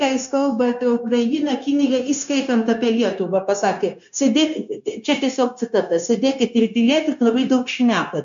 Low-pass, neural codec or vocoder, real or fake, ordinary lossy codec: 7.2 kHz; codec, 16 kHz, 0.9 kbps, LongCat-Audio-Codec; fake; MP3, 64 kbps